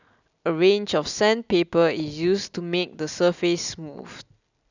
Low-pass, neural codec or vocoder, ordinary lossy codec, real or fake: 7.2 kHz; none; none; real